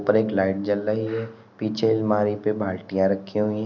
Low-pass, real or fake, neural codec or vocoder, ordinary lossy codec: 7.2 kHz; real; none; none